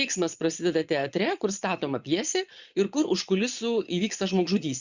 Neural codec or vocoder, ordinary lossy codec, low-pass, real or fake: vocoder, 22.05 kHz, 80 mel bands, Vocos; Opus, 64 kbps; 7.2 kHz; fake